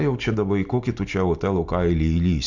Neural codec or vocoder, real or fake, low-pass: none; real; 7.2 kHz